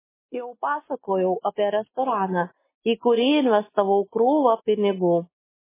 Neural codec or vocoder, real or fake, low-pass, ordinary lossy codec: none; real; 3.6 kHz; MP3, 16 kbps